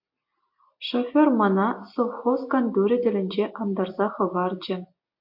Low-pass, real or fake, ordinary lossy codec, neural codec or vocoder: 5.4 kHz; real; AAC, 48 kbps; none